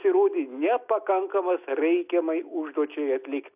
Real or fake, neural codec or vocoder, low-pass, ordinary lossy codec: real; none; 3.6 kHz; AAC, 32 kbps